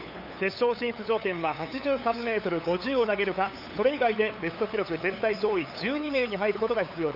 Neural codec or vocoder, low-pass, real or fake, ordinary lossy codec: codec, 16 kHz, 8 kbps, FunCodec, trained on LibriTTS, 25 frames a second; 5.4 kHz; fake; none